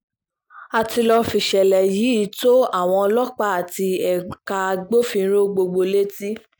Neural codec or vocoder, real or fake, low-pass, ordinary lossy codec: none; real; none; none